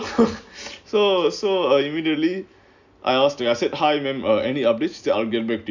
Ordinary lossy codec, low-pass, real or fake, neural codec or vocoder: none; 7.2 kHz; real; none